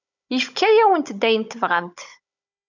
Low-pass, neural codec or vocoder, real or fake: 7.2 kHz; codec, 16 kHz, 16 kbps, FunCodec, trained on Chinese and English, 50 frames a second; fake